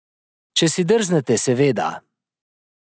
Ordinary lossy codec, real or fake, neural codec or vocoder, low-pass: none; real; none; none